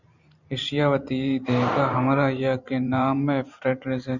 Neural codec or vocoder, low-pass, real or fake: vocoder, 44.1 kHz, 128 mel bands every 512 samples, BigVGAN v2; 7.2 kHz; fake